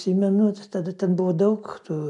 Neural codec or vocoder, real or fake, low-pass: none; real; 10.8 kHz